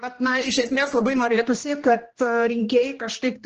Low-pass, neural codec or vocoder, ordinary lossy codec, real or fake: 7.2 kHz; codec, 16 kHz, 1 kbps, X-Codec, HuBERT features, trained on general audio; Opus, 16 kbps; fake